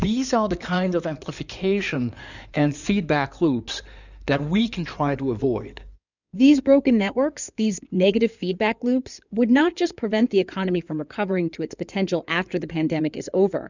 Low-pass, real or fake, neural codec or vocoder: 7.2 kHz; fake; codec, 16 kHz in and 24 kHz out, 2.2 kbps, FireRedTTS-2 codec